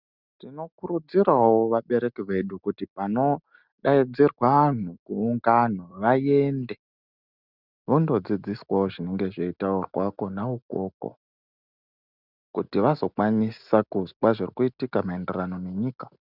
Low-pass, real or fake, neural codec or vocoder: 5.4 kHz; real; none